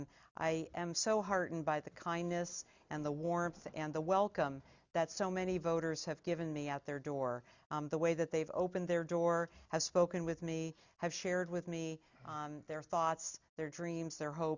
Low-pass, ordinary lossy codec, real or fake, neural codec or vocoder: 7.2 kHz; Opus, 64 kbps; real; none